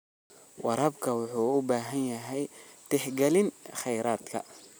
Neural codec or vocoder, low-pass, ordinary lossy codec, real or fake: none; none; none; real